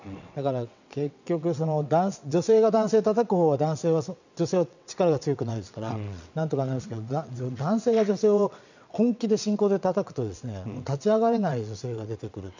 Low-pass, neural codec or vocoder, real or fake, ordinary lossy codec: 7.2 kHz; vocoder, 22.05 kHz, 80 mel bands, WaveNeXt; fake; none